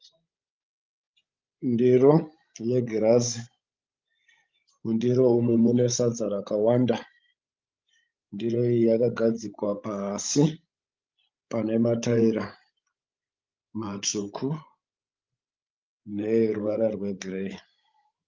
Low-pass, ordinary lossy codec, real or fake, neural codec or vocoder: 7.2 kHz; Opus, 32 kbps; fake; codec, 16 kHz, 16 kbps, FreqCodec, larger model